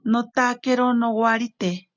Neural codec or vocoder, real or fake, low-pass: none; real; 7.2 kHz